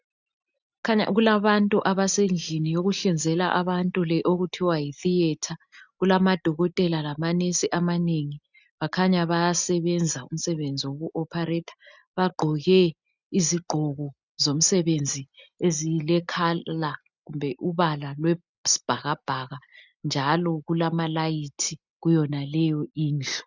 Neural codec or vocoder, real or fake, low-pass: none; real; 7.2 kHz